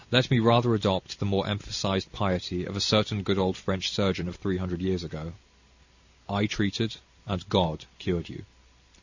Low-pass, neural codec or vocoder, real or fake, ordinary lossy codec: 7.2 kHz; none; real; Opus, 64 kbps